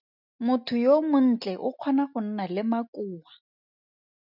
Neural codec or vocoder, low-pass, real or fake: none; 5.4 kHz; real